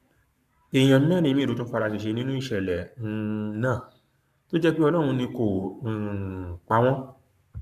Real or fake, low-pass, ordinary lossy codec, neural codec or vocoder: fake; 14.4 kHz; AAC, 96 kbps; codec, 44.1 kHz, 7.8 kbps, Pupu-Codec